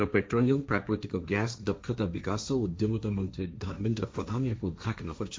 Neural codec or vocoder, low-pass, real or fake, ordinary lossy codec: codec, 16 kHz, 1.1 kbps, Voila-Tokenizer; none; fake; none